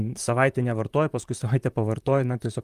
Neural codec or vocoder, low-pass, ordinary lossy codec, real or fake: vocoder, 44.1 kHz, 128 mel bands, Pupu-Vocoder; 14.4 kHz; Opus, 32 kbps; fake